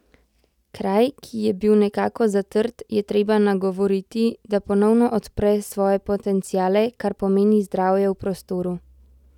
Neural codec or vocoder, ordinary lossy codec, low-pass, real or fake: none; none; 19.8 kHz; real